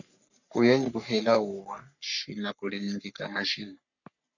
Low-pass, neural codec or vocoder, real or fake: 7.2 kHz; codec, 44.1 kHz, 3.4 kbps, Pupu-Codec; fake